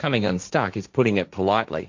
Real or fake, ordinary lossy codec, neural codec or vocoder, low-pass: fake; MP3, 64 kbps; codec, 16 kHz, 1.1 kbps, Voila-Tokenizer; 7.2 kHz